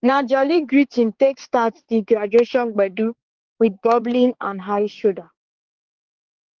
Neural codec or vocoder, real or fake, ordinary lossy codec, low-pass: codec, 16 kHz, 4 kbps, X-Codec, HuBERT features, trained on balanced general audio; fake; Opus, 16 kbps; 7.2 kHz